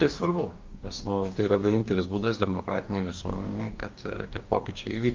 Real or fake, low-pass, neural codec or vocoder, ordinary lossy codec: fake; 7.2 kHz; codec, 44.1 kHz, 2.6 kbps, DAC; Opus, 24 kbps